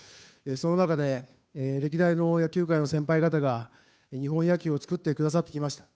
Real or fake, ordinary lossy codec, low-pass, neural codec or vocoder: fake; none; none; codec, 16 kHz, 2 kbps, FunCodec, trained on Chinese and English, 25 frames a second